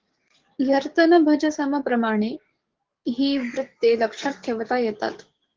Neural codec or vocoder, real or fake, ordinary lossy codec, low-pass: codec, 44.1 kHz, 7.8 kbps, DAC; fake; Opus, 16 kbps; 7.2 kHz